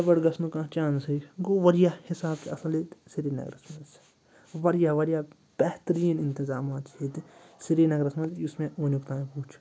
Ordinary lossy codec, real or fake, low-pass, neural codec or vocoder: none; real; none; none